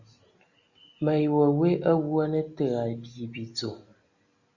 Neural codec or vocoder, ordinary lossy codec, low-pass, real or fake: none; Opus, 64 kbps; 7.2 kHz; real